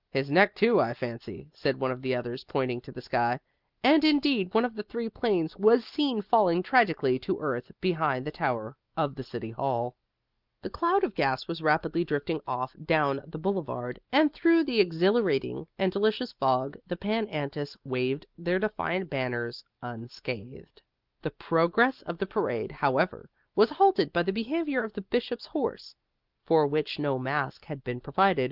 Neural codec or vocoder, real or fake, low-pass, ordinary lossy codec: none; real; 5.4 kHz; Opus, 24 kbps